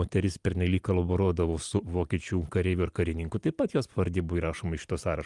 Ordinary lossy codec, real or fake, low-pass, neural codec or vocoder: Opus, 32 kbps; real; 10.8 kHz; none